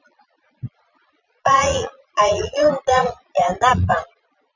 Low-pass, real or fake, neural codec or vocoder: 7.2 kHz; fake; vocoder, 22.05 kHz, 80 mel bands, Vocos